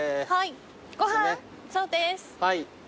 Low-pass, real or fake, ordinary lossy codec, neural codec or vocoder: none; real; none; none